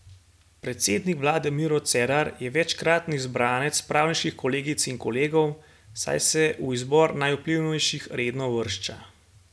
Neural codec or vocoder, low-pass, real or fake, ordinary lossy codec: none; none; real; none